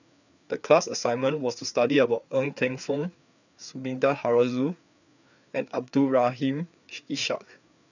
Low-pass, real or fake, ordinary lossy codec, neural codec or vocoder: 7.2 kHz; fake; none; codec, 16 kHz, 4 kbps, FreqCodec, larger model